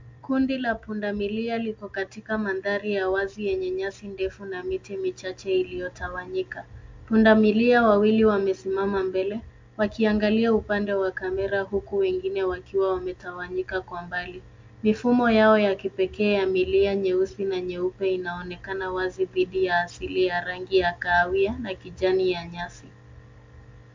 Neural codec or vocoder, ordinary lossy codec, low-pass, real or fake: none; MP3, 64 kbps; 7.2 kHz; real